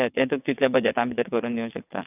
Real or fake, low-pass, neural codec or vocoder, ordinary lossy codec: fake; 3.6 kHz; vocoder, 22.05 kHz, 80 mel bands, WaveNeXt; none